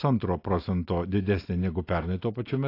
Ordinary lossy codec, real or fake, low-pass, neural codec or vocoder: AAC, 32 kbps; real; 5.4 kHz; none